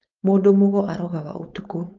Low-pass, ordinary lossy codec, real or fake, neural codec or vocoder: 7.2 kHz; Opus, 24 kbps; fake; codec, 16 kHz, 4.8 kbps, FACodec